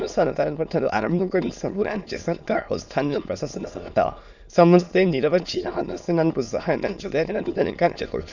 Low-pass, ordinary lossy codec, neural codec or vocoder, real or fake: 7.2 kHz; none; autoencoder, 22.05 kHz, a latent of 192 numbers a frame, VITS, trained on many speakers; fake